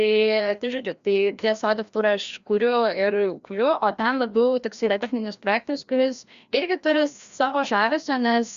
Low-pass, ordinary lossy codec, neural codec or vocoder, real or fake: 7.2 kHz; Opus, 64 kbps; codec, 16 kHz, 1 kbps, FreqCodec, larger model; fake